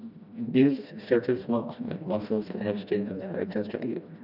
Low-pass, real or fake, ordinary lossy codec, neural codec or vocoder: 5.4 kHz; fake; none; codec, 16 kHz, 1 kbps, FreqCodec, smaller model